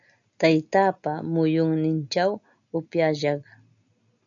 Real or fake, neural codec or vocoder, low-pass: real; none; 7.2 kHz